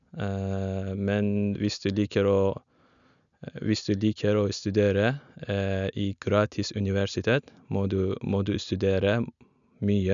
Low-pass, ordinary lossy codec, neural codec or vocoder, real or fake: 7.2 kHz; none; none; real